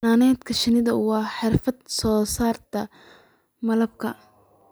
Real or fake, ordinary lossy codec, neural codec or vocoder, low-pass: real; none; none; none